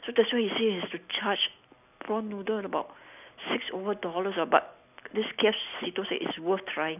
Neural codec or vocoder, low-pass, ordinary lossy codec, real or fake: none; 3.6 kHz; none; real